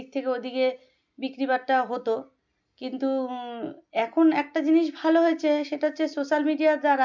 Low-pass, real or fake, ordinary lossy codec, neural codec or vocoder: 7.2 kHz; real; MP3, 64 kbps; none